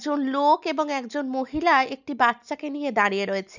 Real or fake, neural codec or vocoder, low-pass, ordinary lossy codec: real; none; 7.2 kHz; none